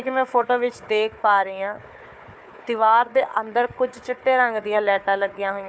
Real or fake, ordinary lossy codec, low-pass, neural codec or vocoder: fake; none; none; codec, 16 kHz, 4 kbps, FunCodec, trained on Chinese and English, 50 frames a second